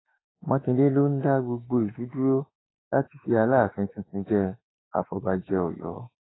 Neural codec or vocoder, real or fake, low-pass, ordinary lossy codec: autoencoder, 48 kHz, 32 numbers a frame, DAC-VAE, trained on Japanese speech; fake; 7.2 kHz; AAC, 16 kbps